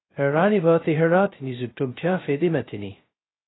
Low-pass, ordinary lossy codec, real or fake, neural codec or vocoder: 7.2 kHz; AAC, 16 kbps; fake; codec, 16 kHz, 0.2 kbps, FocalCodec